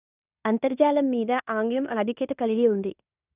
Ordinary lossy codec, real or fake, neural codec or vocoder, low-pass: none; fake; codec, 16 kHz in and 24 kHz out, 0.9 kbps, LongCat-Audio-Codec, fine tuned four codebook decoder; 3.6 kHz